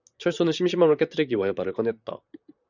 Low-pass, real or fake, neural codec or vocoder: 7.2 kHz; fake; vocoder, 44.1 kHz, 128 mel bands, Pupu-Vocoder